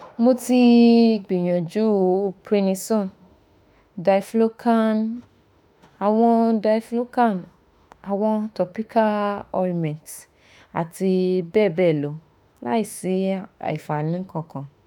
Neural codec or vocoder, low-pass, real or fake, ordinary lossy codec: autoencoder, 48 kHz, 32 numbers a frame, DAC-VAE, trained on Japanese speech; 19.8 kHz; fake; none